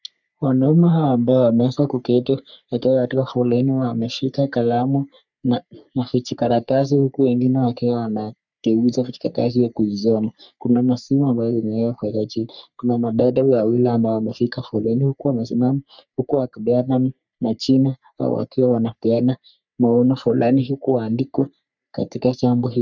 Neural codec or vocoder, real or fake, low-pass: codec, 44.1 kHz, 3.4 kbps, Pupu-Codec; fake; 7.2 kHz